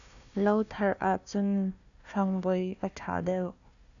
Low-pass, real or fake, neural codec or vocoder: 7.2 kHz; fake; codec, 16 kHz, 1 kbps, FunCodec, trained on Chinese and English, 50 frames a second